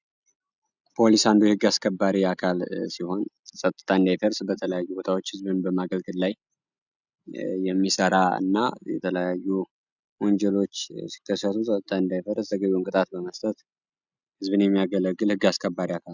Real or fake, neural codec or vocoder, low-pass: real; none; 7.2 kHz